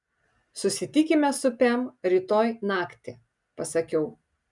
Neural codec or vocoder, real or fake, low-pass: none; real; 10.8 kHz